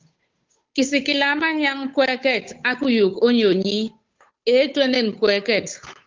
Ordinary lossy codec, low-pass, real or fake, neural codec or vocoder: Opus, 24 kbps; 7.2 kHz; fake; codec, 16 kHz, 8 kbps, FunCodec, trained on Chinese and English, 25 frames a second